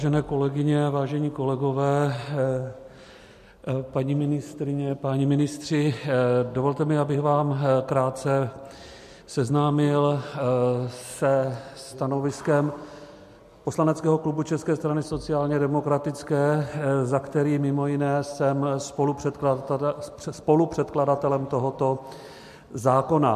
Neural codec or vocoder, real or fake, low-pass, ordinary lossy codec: none; real; 14.4 kHz; MP3, 64 kbps